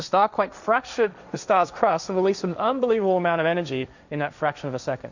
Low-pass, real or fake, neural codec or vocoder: 7.2 kHz; fake; codec, 16 kHz, 1.1 kbps, Voila-Tokenizer